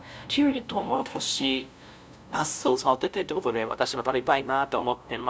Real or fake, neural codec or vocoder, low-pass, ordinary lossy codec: fake; codec, 16 kHz, 0.5 kbps, FunCodec, trained on LibriTTS, 25 frames a second; none; none